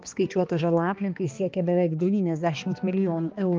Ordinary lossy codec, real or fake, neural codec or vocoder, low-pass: Opus, 24 kbps; fake; codec, 16 kHz, 2 kbps, X-Codec, HuBERT features, trained on balanced general audio; 7.2 kHz